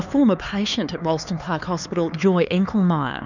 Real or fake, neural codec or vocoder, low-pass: fake; codec, 16 kHz, 4 kbps, X-Codec, HuBERT features, trained on LibriSpeech; 7.2 kHz